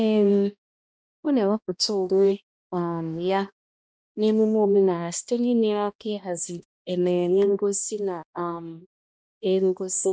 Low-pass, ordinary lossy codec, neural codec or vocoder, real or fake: none; none; codec, 16 kHz, 1 kbps, X-Codec, HuBERT features, trained on balanced general audio; fake